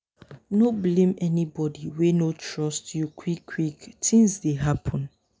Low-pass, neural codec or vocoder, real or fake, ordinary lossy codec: none; none; real; none